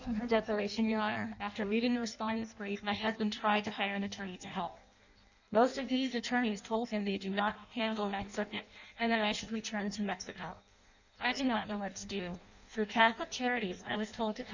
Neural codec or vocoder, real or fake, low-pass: codec, 16 kHz in and 24 kHz out, 0.6 kbps, FireRedTTS-2 codec; fake; 7.2 kHz